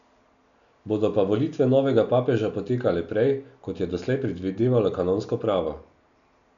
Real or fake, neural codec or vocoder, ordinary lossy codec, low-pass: real; none; none; 7.2 kHz